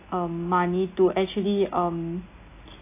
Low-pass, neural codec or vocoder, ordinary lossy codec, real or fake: 3.6 kHz; none; AAC, 24 kbps; real